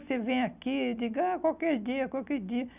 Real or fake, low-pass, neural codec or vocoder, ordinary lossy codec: real; 3.6 kHz; none; none